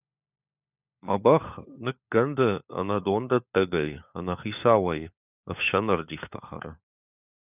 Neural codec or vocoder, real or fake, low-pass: codec, 16 kHz, 4 kbps, FunCodec, trained on LibriTTS, 50 frames a second; fake; 3.6 kHz